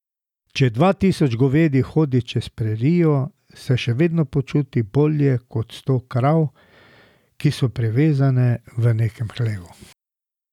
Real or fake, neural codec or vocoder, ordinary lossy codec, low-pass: real; none; none; 19.8 kHz